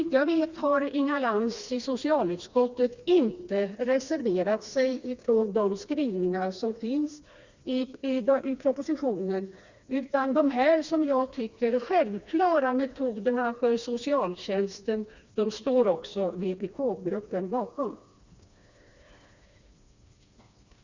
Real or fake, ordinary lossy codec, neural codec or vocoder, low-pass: fake; Opus, 64 kbps; codec, 16 kHz, 2 kbps, FreqCodec, smaller model; 7.2 kHz